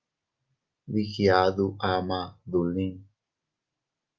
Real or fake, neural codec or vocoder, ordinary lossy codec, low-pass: real; none; Opus, 24 kbps; 7.2 kHz